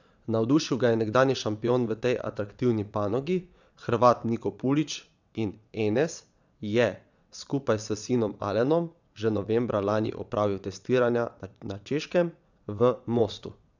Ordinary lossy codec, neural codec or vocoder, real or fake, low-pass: none; vocoder, 22.05 kHz, 80 mel bands, WaveNeXt; fake; 7.2 kHz